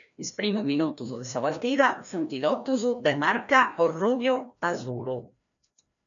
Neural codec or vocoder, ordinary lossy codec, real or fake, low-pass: codec, 16 kHz, 1 kbps, FreqCodec, larger model; MP3, 96 kbps; fake; 7.2 kHz